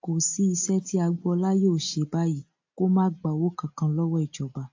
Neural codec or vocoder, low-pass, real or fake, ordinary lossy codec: none; 7.2 kHz; real; AAC, 48 kbps